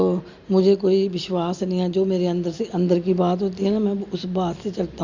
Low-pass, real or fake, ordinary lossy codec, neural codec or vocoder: 7.2 kHz; real; Opus, 64 kbps; none